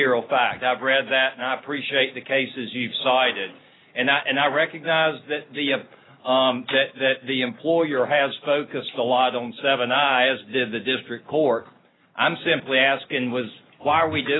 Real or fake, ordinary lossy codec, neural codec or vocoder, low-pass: real; AAC, 16 kbps; none; 7.2 kHz